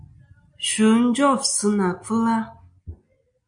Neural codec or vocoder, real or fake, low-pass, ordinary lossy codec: none; real; 9.9 kHz; MP3, 96 kbps